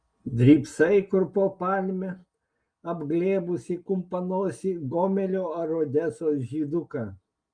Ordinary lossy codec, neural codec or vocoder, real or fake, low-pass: Opus, 32 kbps; none; real; 9.9 kHz